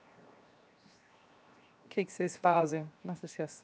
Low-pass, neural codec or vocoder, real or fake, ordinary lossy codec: none; codec, 16 kHz, 0.7 kbps, FocalCodec; fake; none